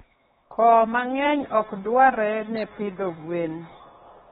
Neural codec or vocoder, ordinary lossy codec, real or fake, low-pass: codec, 16 kHz, 4 kbps, X-Codec, HuBERT features, trained on LibriSpeech; AAC, 16 kbps; fake; 7.2 kHz